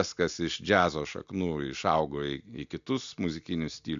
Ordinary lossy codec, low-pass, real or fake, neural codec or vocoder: MP3, 64 kbps; 7.2 kHz; real; none